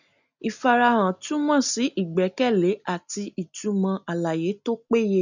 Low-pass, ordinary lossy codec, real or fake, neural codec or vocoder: 7.2 kHz; none; real; none